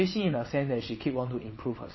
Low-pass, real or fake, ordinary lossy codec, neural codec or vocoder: 7.2 kHz; fake; MP3, 24 kbps; vocoder, 22.05 kHz, 80 mel bands, WaveNeXt